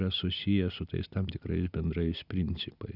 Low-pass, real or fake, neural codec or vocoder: 5.4 kHz; real; none